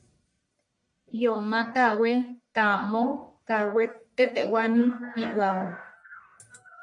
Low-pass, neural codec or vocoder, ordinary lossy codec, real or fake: 10.8 kHz; codec, 44.1 kHz, 1.7 kbps, Pupu-Codec; MP3, 64 kbps; fake